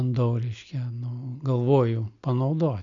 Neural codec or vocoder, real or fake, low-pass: none; real; 7.2 kHz